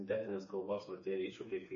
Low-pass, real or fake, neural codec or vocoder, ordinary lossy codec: 7.2 kHz; fake; codec, 16 kHz, 4 kbps, FreqCodec, smaller model; MP3, 32 kbps